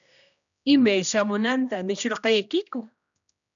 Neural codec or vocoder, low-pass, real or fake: codec, 16 kHz, 1 kbps, X-Codec, HuBERT features, trained on general audio; 7.2 kHz; fake